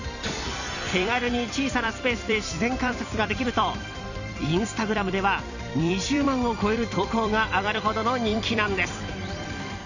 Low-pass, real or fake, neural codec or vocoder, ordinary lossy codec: 7.2 kHz; fake; vocoder, 44.1 kHz, 128 mel bands every 256 samples, BigVGAN v2; none